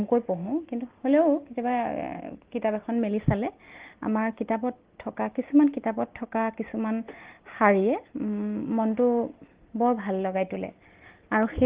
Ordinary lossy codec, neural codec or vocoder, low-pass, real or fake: Opus, 16 kbps; none; 3.6 kHz; real